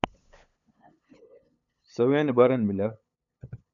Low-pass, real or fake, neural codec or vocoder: 7.2 kHz; fake; codec, 16 kHz, 8 kbps, FunCodec, trained on LibriTTS, 25 frames a second